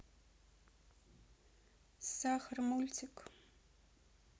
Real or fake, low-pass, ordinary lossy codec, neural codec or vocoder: real; none; none; none